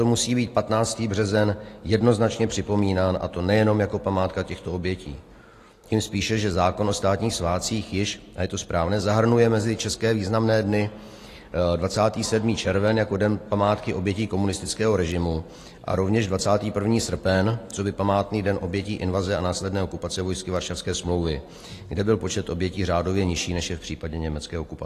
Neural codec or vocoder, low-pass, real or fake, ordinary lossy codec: none; 14.4 kHz; real; AAC, 48 kbps